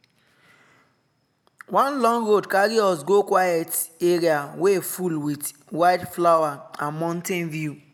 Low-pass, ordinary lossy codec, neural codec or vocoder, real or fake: none; none; none; real